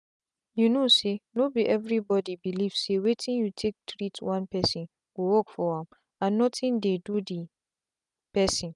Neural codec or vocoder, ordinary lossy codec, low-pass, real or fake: none; none; 10.8 kHz; real